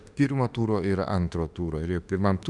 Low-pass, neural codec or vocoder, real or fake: 10.8 kHz; autoencoder, 48 kHz, 32 numbers a frame, DAC-VAE, trained on Japanese speech; fake